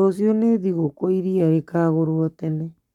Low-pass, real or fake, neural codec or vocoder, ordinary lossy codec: 19.8 kHz; fake; codec, 44.1 kHz, 7.8 kbps, Pupu-Codec; none